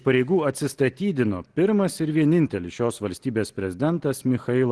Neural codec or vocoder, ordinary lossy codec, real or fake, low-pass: none; Opus, 16 kbps; real; 10.8 kHz